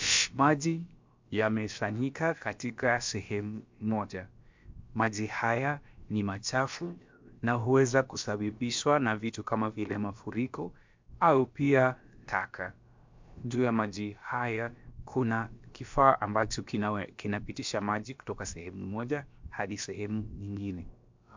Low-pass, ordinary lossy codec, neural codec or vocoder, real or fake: 7.2 kHz; MP3, 64 kbps; codec, 16 kHz, about 1 kbps, DyCAST, with the encoder's durations; fake